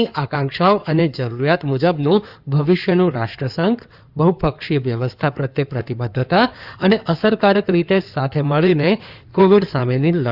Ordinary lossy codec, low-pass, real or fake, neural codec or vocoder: Opus, 64 kbps; 5.4 kHz; fake; codec, 16 kHz in and 24 kHz out, 2.2 kbps, FireRedTTS-2 codec